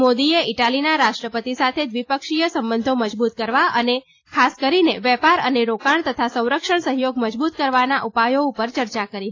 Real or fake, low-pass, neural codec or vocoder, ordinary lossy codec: real; 7.2 kHz; none; AAC, 32 kbps